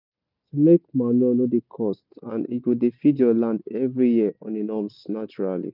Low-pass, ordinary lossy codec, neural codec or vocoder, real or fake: 5.4 kHz; none; none; real